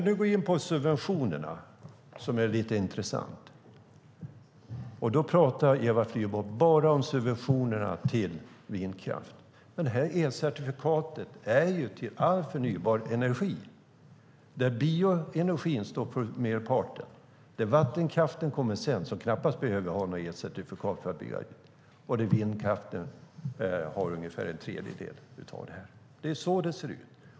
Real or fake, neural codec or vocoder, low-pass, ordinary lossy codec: real; none; none; none